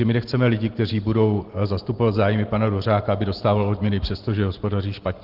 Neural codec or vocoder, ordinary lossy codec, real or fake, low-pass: none; Opus, 16 kbps; real; 5.4 kHz